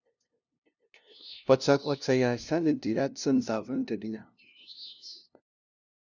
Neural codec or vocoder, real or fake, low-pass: codec, 16 kHz, 0.5 kbps, FunCodec, trained on LibriTTS, 25 frames a second; fake; 7.2 kHz